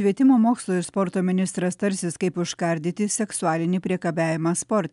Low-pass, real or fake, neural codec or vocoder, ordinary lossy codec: 10.8 kHz; real; none; MP3, 96 kbps